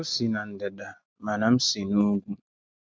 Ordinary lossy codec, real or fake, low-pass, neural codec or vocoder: none; real; none; none